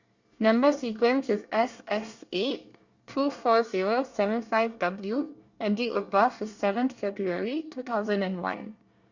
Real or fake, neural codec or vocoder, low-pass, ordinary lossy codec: fake; codec, 24 kHz, 1 kbps, SNAC; 7.2 kHz; Opus, 64 kbps